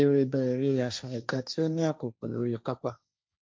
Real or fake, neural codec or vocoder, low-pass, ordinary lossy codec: fake; codec, 16 kHz, 1.1 kbps, Voila-Tokenizer; none; none